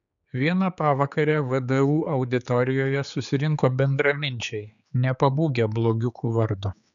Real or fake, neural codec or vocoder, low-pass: fake; codec, 16 kHz, 4 kbps, X-Codec, HuBERT features, trained on general audio; 7.2 kHz